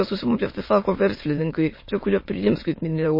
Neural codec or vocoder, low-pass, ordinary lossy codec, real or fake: autoencoder, 22.05 kHz, a latent of 192 numbers a frame, VITS, trained on many speakers; 5.4 kHz; MP3, 24 kbps; fake